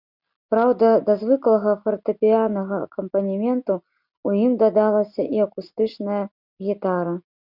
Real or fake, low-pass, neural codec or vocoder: real; 5.4 kHz; none